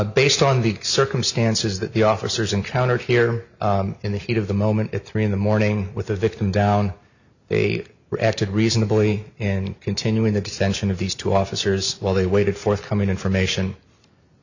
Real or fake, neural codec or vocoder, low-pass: real; none; 7.2 kHz